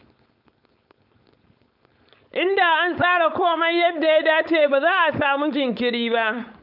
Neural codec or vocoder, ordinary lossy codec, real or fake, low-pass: codec, 16 kHz, 4.8 kbps, FACodec; none; fake; 5.4 kHz